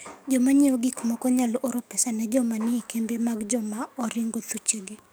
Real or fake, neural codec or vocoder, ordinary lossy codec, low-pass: fake; codec, 44.1 kHz, 7.8 kbps, DAC; none; none